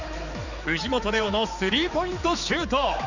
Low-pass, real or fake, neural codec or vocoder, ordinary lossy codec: 7.2 kHz; fake; codec, 16 kHz, 4 kbps, X-Codec, HuBERT features, trained on general audio; none